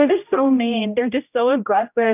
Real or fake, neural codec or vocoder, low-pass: fake; codec, 16 kHz, 0.5 kbps, X-Codec, HuBERT features, trained on general audio; 3.6 kHz